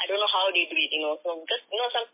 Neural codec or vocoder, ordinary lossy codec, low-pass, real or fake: none; MP3, 24 kbps; 3.6 kHz; real